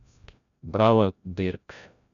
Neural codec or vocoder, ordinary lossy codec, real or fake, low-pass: codec, 16 kHz, 0.5 kbps, FreqCodec, larger model; none; fake; 7.2 kHz